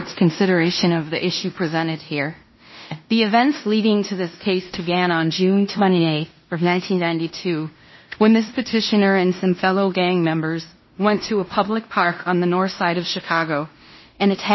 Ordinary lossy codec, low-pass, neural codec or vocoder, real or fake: MP3, 24 kbps; 7.2 kHz; codec, 16 kHz in and 24 kHz out, 0.9 kbps, LongCat-Audio-Codec, fine tuned four codebook decoder; fake